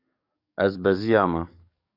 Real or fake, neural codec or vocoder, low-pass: fake; codec, 44.1 kHz, 7.8 kbps, DAC; 5.4 kHz